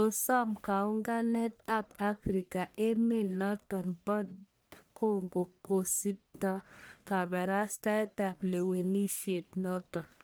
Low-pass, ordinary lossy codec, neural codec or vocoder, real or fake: none; none; codec, 44.1 kHz, 1.7 kbps, Pupu-Codec; fake